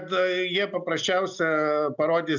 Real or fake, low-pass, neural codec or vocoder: real; 7.2 kHz; none